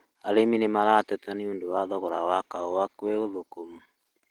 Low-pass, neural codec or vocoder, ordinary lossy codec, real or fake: 19.8 kHz; none; Opus, 16 kbps; real